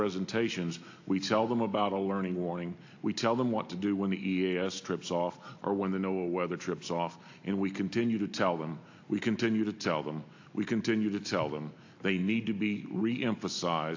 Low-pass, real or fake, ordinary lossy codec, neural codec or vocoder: 7.2 kHz; real; AAC, 48 kbps; none